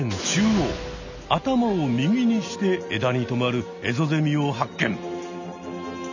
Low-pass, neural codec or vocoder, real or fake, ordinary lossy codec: 7.2 kHz; none; real; none